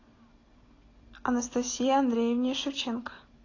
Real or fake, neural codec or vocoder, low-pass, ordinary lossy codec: real; none; 7.2 kHz; AAC, 32 kbps